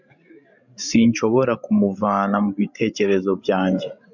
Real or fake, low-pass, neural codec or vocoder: fake; 7.2 kHz; codec, 16 kHz, 16 kbps, FreqCodec, larger model